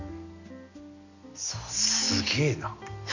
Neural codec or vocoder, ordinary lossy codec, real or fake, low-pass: none; none; real; 7.2 kHz